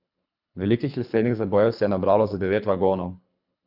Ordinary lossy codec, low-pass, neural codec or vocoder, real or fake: none; 5.4 kHz; codec, 24 kHz, 3 kbps, HILCodec; fake